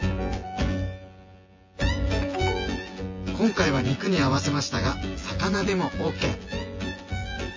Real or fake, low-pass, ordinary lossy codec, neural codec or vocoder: fake; 7.2 kHz; MP3, 32 kbps; vocoder, 24 kHz, 100 mel bands, Vocos